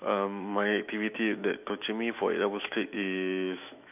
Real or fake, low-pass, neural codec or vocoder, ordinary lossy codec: fake; 3.6 kHz; autoencoder, 48 kHz, 128 numbers a frame, DAC-VAE, trained on Japanese speech; none